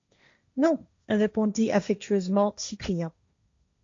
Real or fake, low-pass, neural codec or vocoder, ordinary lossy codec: fake; 7.2 kHz; codec, 16 kHz, 1.1 kbps, Voila-Tokenizer; AAC, 48 kbps